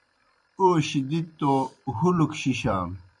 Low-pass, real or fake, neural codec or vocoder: 10.8 kHz; fake; vocoder, 44.1 kHz, 128 mel bands every 256 samples, BigVGAN v2